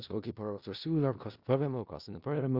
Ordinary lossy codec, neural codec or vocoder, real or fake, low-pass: Opus, 64 kbps; codec, 16 kHz in and 24 kHz out, 0.4 kbps, LongCat-Audio-Codec, four codebook decoder; fake; 5.4 kHz